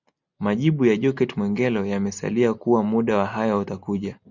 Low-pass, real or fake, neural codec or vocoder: 7.2 kHz; real; none